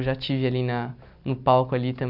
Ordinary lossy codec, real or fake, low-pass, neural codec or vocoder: none; real; 5.4 kHz; none